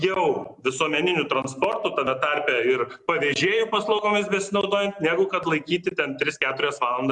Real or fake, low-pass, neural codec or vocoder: real; 10.8 kHz; none